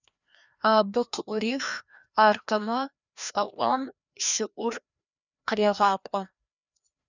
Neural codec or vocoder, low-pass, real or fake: codec, 16 kHz, 1 kbps, FreqCodec, larger model; 7.2 kHz; fake